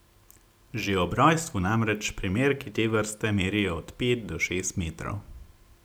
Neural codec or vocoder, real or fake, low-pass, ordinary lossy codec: none; real; none; none